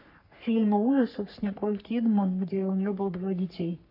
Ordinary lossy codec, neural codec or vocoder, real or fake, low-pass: AAC, 48 kbps; codec, 44.1 kHz, 3.4 kbps, Pupu-Codec; fake; 5.4 kHz